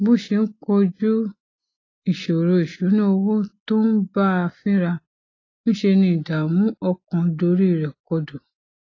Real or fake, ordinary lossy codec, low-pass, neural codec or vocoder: real; AAC, 32 kbps; 7.2 kHz; none